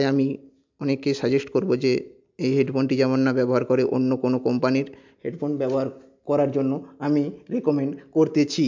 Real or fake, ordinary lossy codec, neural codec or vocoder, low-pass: real; MP3, 64 kbps; none; 7.2 kHz